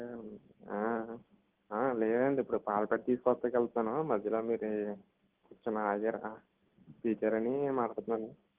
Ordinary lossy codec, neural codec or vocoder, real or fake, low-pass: Opus, 16 kbps; none; real; 3.6 kHz